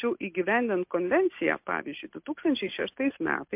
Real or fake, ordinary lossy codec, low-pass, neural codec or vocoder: real; MP3, 32 kbps; 3.6 kHz; none